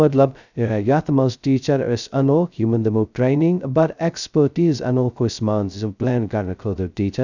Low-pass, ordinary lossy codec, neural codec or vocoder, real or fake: 7.2 kHz; none; codec, 16 kHz, 0.2 kbps, FocalCodec; fake